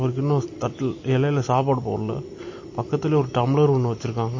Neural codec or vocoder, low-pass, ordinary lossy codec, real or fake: none; 7.2 kHz; MP3, 32 kbps; real